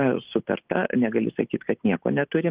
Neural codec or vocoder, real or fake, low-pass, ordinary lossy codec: none; real; 3.6 kHz; Opus, 32 kbps